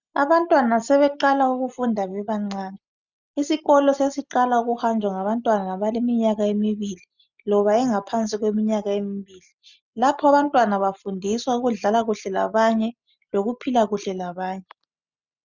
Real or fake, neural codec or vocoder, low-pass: real; none; 7.2 kHz